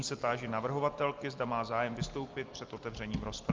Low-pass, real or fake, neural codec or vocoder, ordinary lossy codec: 7.2 kHz; real; none; Opus, 32 kbps